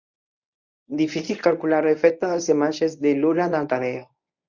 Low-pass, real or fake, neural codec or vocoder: 7.2 kHz; fake; codec, 24 kHz, 0.9 kbps, WavTokenizer, medium speech release version 1